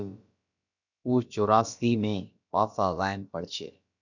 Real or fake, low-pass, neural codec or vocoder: fake; 7.2 kHz; codec, 16 kHz, about 1 kbps, DyCAST, with the encoder's durations